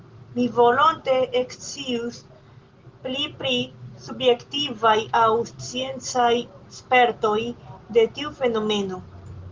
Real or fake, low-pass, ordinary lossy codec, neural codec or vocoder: real; 7.2 kHz; Opus, 16 kbps; none